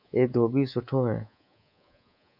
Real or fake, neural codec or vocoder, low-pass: fake; codec, 24 kHz, 3.1 kbps, DualCodec; 5.4 kHz